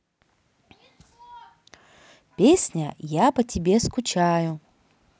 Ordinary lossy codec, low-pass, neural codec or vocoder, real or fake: none; none; none; real